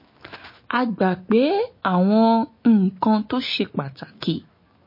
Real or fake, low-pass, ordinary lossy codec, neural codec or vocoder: fake; 5.4 kHz; MP3, 24 kbps; autoencoder, 48 kHz, 128 numbers a frame, DAC-VAE, trained on Japanese speech